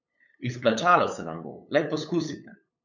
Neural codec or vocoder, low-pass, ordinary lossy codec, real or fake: codec, 16 kHz, 8 kbps, FunCodec, trained on LibriTTS, 25 frames a second; 7.2 kHz; none; fake